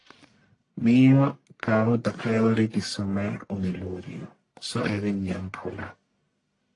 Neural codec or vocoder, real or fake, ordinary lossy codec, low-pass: codec, 44.1 kHz, 1.7 kbps, Pupu-Codec; fake; AAC, 48 kbps; 10.8 kHz